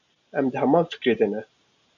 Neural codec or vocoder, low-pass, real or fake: none; 7.2 kHz; real